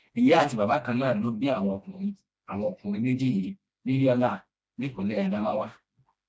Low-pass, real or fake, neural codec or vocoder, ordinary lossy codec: none; fake; codec, 16 kHz, 1 kbps, FreqCodec, smaller model; none